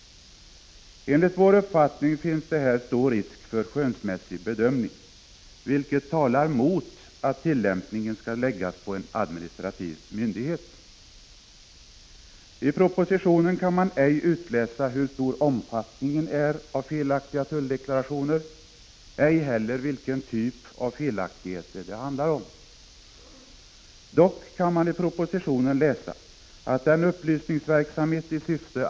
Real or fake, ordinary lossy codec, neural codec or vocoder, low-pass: real; none; none; none